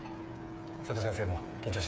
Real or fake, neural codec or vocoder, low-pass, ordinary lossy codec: fake; codec, 16 kHz, 8 kbps, FreqCodec, smaller model; none; none